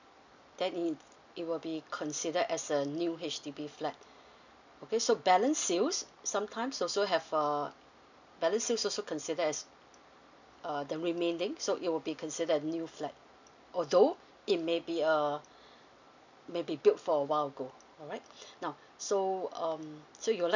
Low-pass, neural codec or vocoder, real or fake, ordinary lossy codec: 7.2 kHz; none; real; none